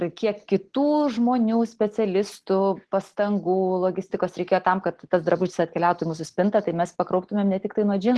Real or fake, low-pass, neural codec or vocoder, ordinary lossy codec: real; 10.8 kHz; none; Opus, 16 kbps